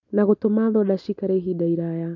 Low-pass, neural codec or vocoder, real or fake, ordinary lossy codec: 7.2 kHz; none; real; none